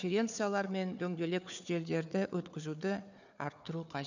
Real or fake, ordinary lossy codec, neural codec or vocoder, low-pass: fake; none; codec, 16 kHz, 4 kbps, FunCodec, trained on Chinese and English, 50 frames a second; 7.2 kHz